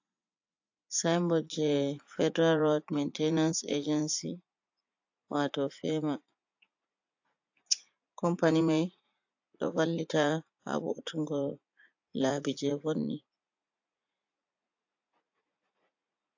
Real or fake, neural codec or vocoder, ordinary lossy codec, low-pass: fake; vocoder, 44.1 kHz, 80 mel bands, Vocos; AAC, 48 kbps; 7.2 kHz